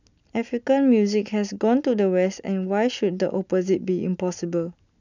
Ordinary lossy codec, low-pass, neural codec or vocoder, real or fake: none; 7.2 kHz; none; real